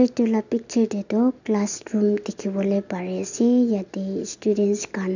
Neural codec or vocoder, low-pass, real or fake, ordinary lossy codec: none; 7.2 kHz; real; none